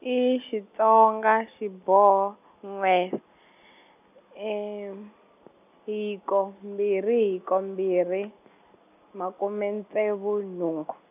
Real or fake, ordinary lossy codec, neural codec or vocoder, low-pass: real; none; none; 3.6 kHz